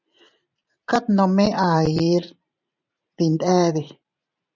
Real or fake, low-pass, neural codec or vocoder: fake; 7.2 kHz; vocoder, 44.1 kHz, 128 mel bands every 512 samples, BigVGAN v2